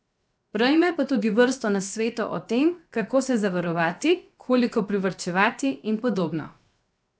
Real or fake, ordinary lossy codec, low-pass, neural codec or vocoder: fake; none; none; codec, 16 kHz, 0.7 kbps, FocalCodec